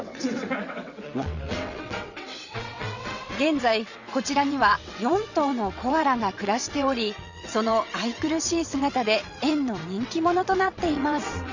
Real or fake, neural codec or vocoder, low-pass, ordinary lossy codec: fake; vocoder, 22.05 kHz, 80 mel bands, WaveNeXt; 7.2 kHz; Opus, 64 kbps